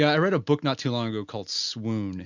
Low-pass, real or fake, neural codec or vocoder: 7.2 kHz; real; none